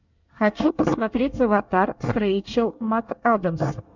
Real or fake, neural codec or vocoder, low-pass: fake; codec, 24 kHz, 1 kbps, SNAC; 7.2 kHz